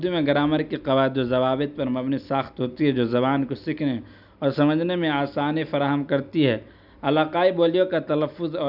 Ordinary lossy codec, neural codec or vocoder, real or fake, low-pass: none; none; real; 5.4 kHz